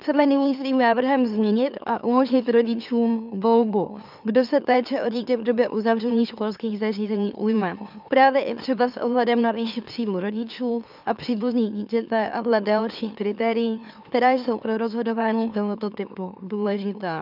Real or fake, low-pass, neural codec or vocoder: fake; 5.4 kHz; autoencoder, 44.1 kHz, a latent of 192 numbers a frame, MeloTTS